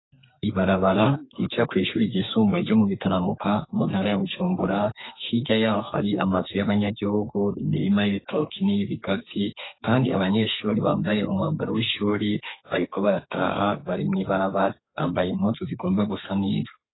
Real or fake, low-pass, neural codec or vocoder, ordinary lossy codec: fake; 7.2 kHz; codec, 32 kHz, 1.9 kbps, SNAC; AAC, 16 kbps